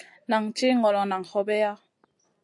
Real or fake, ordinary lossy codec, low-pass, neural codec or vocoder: real; AAC, 64 kbps; 10.8 kHz; none